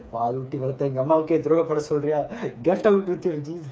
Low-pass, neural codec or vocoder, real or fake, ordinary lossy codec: none; codec, 16 kHz, 4 kbps, FreqCodec, smaller model; fake; none